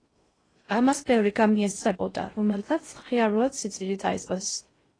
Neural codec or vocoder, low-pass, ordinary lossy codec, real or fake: codec, 16 kHz in and 24 kHz out, 0.6 kbps, FocalCodec, streaming, 2048 codes; 9.9 kHz; AAC, 32 kbps; fake